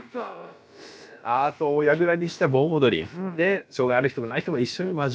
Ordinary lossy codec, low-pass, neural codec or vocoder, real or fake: none; none; codec, 16 kHz, about 1 kbps, DyCAST, with the encoder's durations; fake